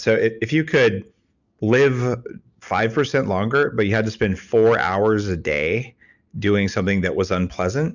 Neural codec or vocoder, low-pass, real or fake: none; 7.2 kHz; real